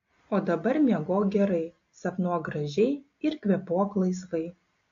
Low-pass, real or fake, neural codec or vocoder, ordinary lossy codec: 7.2 kHz; real; none; AAC, 48 kbps